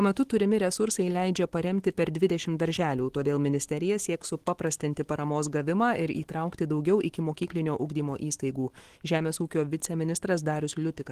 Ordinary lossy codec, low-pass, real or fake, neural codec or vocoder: Opus, 16 kbps; 14.4 kHz; fake; autoencoder, 48 kHz, 128 numbers a frame, DAC-VAE, trained on Japanese speech